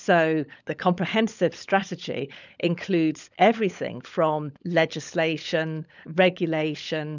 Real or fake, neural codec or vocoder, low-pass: fake; codec, 16 kHz, 16 kbps, FunCodec, trained on LibriTTS, 50 frames a second; 7.2 kHz